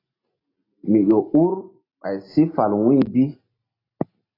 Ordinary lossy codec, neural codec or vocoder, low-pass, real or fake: AAC, 24 kbps; none; 5.4 kHz; real